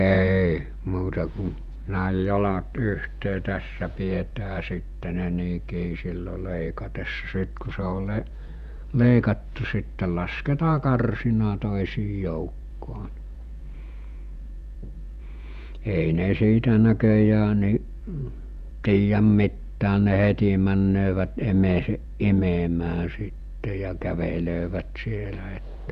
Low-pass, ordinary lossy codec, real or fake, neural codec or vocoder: 14.4 kHz; none; fake; vocoder, 48 kHz, 128 mel bands, Vocos